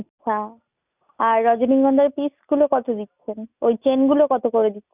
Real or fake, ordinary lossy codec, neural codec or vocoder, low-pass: real; none; none; 3.6 kHz